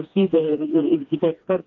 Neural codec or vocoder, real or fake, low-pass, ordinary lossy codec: codec, 16 kHz, 2 kbps, FreqCodec, smaller model; fake; 7.2 kHz; AAC, 48 kbps